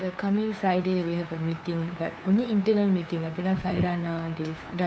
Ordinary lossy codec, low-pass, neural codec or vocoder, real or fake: none; none; codec, 16 kHz, 2 kbps, FunCodec, trained on LibriTTS, 25 frames a second; fake